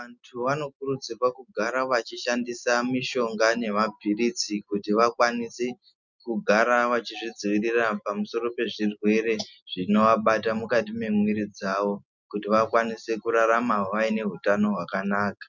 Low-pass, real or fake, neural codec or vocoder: 7.2 kHz; real; none